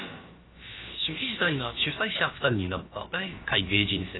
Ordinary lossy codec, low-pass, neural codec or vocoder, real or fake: AAC, 16 kbps; 7.2 kHz; codec, 16 kHz, about 1 kbps, DyCAST, with the encoder's durations; fake